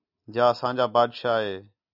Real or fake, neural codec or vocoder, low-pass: real; none; 5.4 kHz